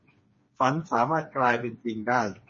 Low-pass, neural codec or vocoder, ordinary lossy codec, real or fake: 7.2 kHz; codec, 16 kHz, 4 kbps, FreqCodec, smaller model; MP3, 32 kbps; fake